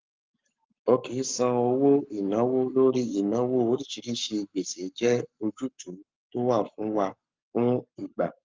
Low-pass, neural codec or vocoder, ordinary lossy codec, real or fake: 7.2 kHz; codec, 44.1 kHz, 7.8 kbps, Pupu-Codec; Opus, 32 kbps; fake